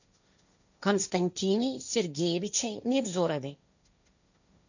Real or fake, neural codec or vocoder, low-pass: fake; codec, 16 kHz, 1.1 kbps, Voila-Tokenizer; 7.2 kHz